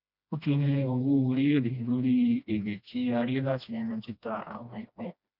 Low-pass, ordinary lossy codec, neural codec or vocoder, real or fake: 5.4 kHz; none; codec, 16 kHz, 1 kbps, FreqCodec, smaller model; fake